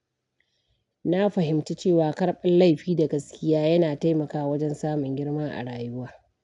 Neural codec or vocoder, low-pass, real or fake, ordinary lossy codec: none; 10.8 kHz; real; none